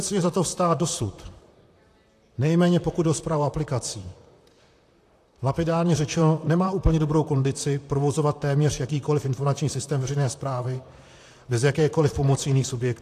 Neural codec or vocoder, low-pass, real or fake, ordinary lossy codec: vocoder, 44.1 kHz, 128 mel bands, Pupu-Vocoder; 14.4 kHz; fake; AAC, 64 kbps